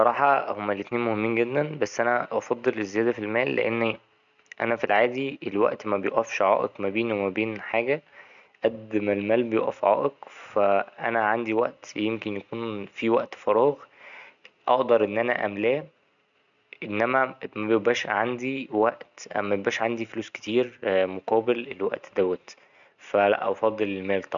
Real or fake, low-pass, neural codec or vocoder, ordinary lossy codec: real; 7.2 kHz; none; none